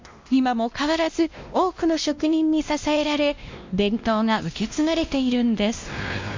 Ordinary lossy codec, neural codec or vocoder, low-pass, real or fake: MP3, 64 kbps; codec, 16 kHz, 1 kbps, X-Codec, WavLM features, trained on Multilingual LibriSpeech; 7.2 kHz; fake